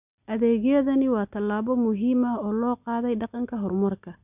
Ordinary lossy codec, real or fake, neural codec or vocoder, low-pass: none; real; none; 3.6 kHz